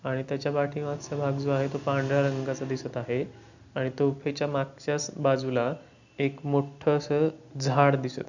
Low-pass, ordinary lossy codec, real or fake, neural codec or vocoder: 7.2 kHz; none; real; none